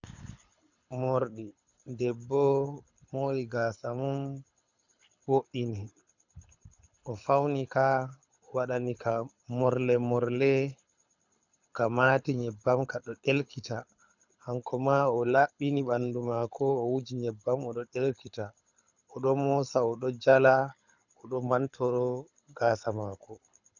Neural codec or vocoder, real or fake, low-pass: codec, 24 kHz, 6 kbps, HILCodec; fake; 7.2 kHz